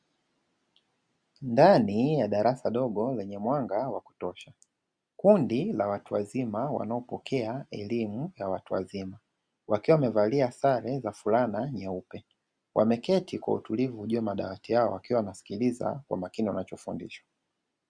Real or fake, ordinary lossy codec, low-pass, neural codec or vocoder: real; Opus, 64 kbps; 9.9 kHz; none